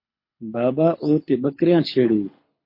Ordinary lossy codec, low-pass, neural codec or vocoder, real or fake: MP3, 32 kbps; 5.4 kHz; codec, 24 kHz, 6 kbps, HILCodec; fake